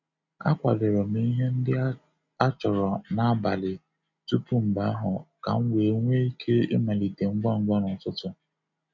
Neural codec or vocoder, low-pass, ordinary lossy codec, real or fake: none; 7.2 kHz; none; real